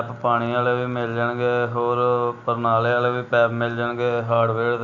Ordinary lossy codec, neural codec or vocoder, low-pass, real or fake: none; none; 7.2 kHz; real